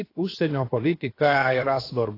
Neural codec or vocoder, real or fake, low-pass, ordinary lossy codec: codec, 16 kHz, 0.7 kbps, FocalCodec; fake; 5.4 kHz; AAC, 24 kbps